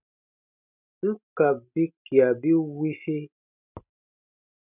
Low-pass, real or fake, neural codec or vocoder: 3.6 kHz; real; none